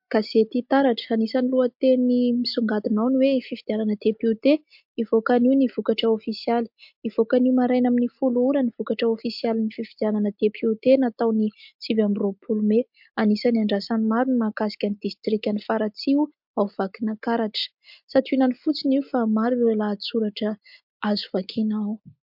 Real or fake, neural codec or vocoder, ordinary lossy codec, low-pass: real; none; MP3, 48 kbps; 5.4 kHz